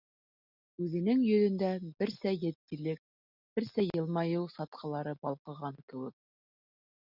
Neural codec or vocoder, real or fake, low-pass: none; real; 5.4 kHz